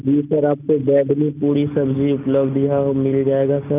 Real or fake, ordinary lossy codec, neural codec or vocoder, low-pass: real; none; none; 3.6 kHz